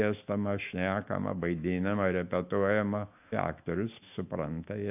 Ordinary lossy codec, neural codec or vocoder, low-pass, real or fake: AAC, 32 kbps; autoencoder, 48 kHz, 128 numbers a frame, DAC-VAE, trained on Japanese speech; 3.6 kHz; fake